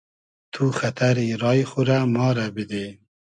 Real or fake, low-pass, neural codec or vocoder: real; 9.9 kHz; none